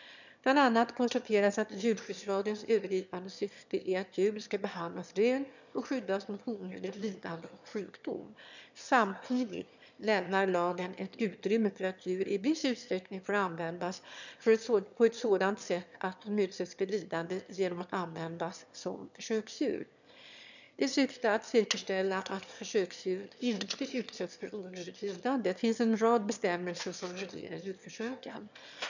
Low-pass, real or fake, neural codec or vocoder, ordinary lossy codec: 7.2 kHz; fake; autoencoder, 22.05 kHz, a latent of 192 numbers a frame, VITS, trained on one speaker; none